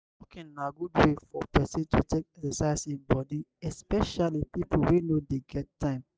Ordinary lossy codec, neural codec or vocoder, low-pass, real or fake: none; none; none; real